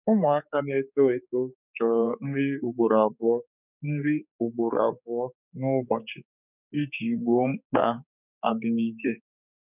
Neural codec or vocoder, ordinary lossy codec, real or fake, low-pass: codec, 16 kHz, 4 kbps, X-Codec, HuBERT features, trained on general audio; none; fake; 3.6 kHz